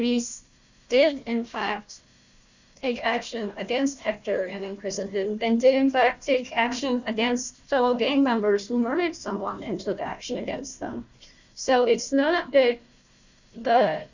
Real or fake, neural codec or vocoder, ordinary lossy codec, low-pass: fake; codec, 16 kHz, 1 kbps, FunCodec, trained on Chinese and English, 50 frames a second; Opus, 64 kbps; 7.2 kHz